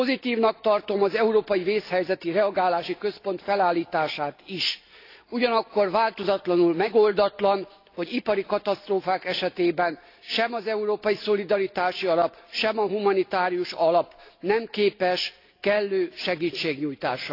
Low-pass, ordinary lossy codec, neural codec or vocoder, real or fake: 5.4 kHz; AAC, 32 kbps; none; real